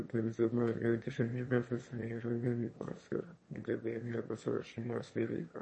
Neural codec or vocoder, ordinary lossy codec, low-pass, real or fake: autoencoder, 22.05 kHz, a latent of 192 numbers a frame, VITS, trained on one speaker; MP3, 32 kbps; 9.9 kHz; fake